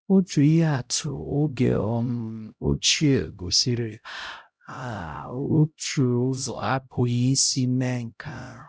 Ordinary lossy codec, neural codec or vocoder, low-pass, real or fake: none; codec, 16 kHz, 0.5 kbps, X-Codec, HuBERT features, trained on LibriSpeech; none; fake